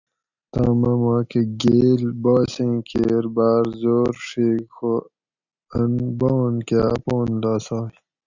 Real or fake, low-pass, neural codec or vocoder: real; 7.2 kHz; none